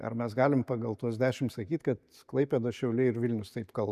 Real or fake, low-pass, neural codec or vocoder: real; 14.4 kHz; none